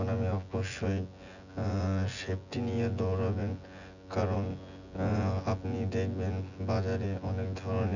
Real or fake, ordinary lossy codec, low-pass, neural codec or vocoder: fake; none; 7.2 kHz; vocoder, 24 kHz, 100 mel bands, Vocos